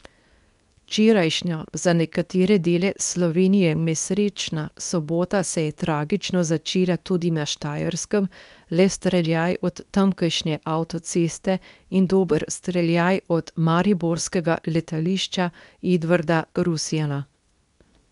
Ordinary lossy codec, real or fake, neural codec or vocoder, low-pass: none; fake; codec, 24 kHz, 0.9 kbps, WavTokenizer, medium speech release version 2; 10.8 kHz